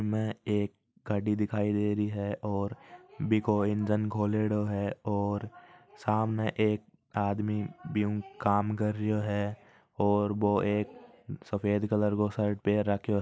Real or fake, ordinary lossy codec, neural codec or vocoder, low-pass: real; none; none; none